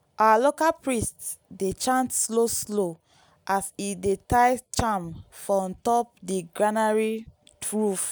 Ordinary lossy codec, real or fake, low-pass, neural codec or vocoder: none; real; none; none